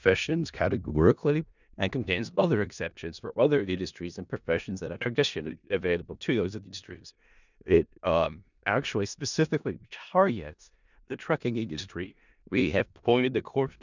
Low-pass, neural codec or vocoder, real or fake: 7.2 kHz; codec, 16 kHz in and 24 kHz out, 0.4 kbps, LongCat-Audio-Codec, four codebook decoder; fake